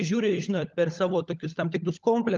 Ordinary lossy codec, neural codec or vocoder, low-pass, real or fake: Opus, 32 kbps; codec, 16 kHz, 16 kbps, FunCodec, trained on LibriTTS, 50 frames a second; 7.2 kHz; fake